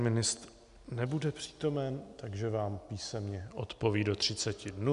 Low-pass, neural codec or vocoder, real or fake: 10.8 kHz; none; real